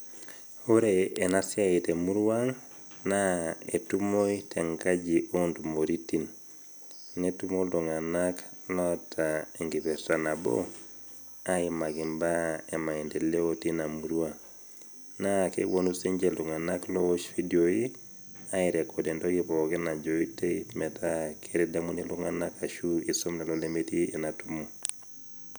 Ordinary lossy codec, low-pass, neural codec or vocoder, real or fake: none; none; none; real